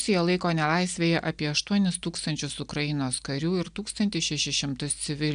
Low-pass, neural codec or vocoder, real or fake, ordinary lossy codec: 9.9 kHz; none; real; AAC, 96 kbps